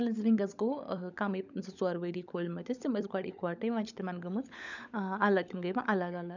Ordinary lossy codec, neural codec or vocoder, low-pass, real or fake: none; codec, 16 kHz, 16 kbps, FunCodec, trained on LibriTTS, 50 frames a second; 7.2 kHz; fake